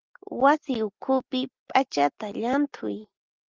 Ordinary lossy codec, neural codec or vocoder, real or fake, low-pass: Opus, 32 kbps; none; real; 7.2 kHz